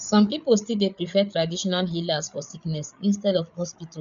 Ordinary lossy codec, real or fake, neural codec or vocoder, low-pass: none; fake; codec, 16 kHz, 8 kbps, FreqCodec, larger model; 7.2 kHz